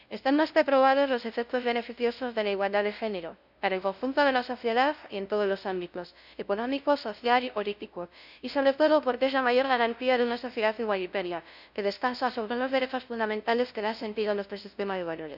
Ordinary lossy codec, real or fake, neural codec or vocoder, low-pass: none; fake; codec, 16 kHz, 0.5 kbps, FunCodec, trained on LibriTTS, 25 frames a second; 5.4 kHz